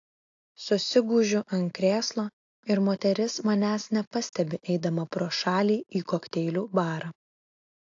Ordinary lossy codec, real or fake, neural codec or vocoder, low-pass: AAC, 48 kbps; real; none; 7.2 kHz